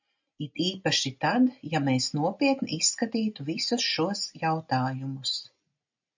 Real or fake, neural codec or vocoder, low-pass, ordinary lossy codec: real; none; 7.2 kHz; MP3, 64 kbps